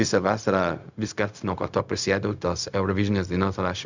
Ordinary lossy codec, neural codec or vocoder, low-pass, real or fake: Opus, 64 kbps; codec, 16 kHz, 0.4 kbps, LongCat-Audio-Codec; 7.2 kHz; fake